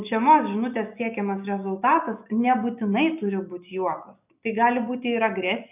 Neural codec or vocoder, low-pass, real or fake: none; 3.6 kHz; real